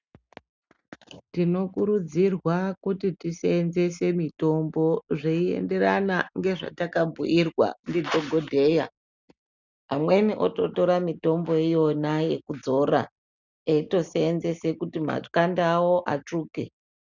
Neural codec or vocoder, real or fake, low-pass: none; real; 7.2 kHz